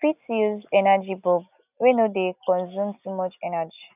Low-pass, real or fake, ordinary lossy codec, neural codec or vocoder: 3.6 kHz; real; none; none